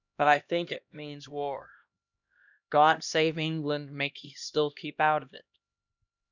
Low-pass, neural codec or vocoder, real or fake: 7.2 kHz; codec, 16 kHz, 1 kbps, X-Codec, HuBERT features, trained on LibriSpeech; fake